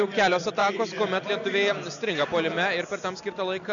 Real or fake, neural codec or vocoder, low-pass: real; none; 7.2 kHz